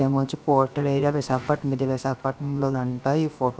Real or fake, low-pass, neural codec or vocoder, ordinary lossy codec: fake; none; codec, 16 kHz, about 1 kbps, DyCAST, with the encoder's durations; none